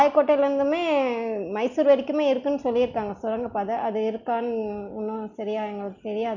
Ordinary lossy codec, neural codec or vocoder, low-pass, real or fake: none; none; 7.2 kHz; real